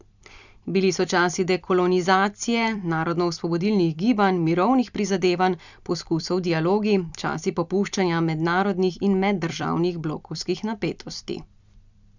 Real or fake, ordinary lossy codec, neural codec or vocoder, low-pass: fake; none; vocoder, 44.1 kHz, 128 mel bands every 256 samples, BigVGAN v2; 7.2 kHz